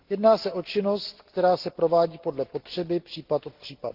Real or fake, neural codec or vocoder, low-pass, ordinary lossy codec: real; none; 5.4 kHz; Opus, 16 kbps